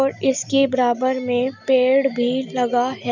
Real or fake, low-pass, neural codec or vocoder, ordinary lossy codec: real; 7.2 kHz; none; none